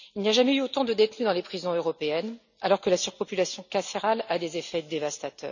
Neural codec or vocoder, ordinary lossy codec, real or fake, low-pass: none; none; real; 7.2 kHz